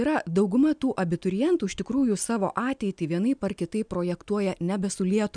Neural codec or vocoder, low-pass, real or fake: none; 9.9 kHz; real